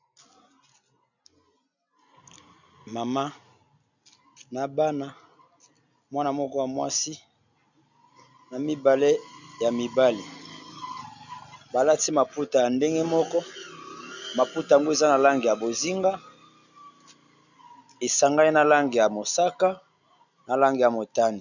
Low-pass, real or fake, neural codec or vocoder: 7.2 kHz; real; none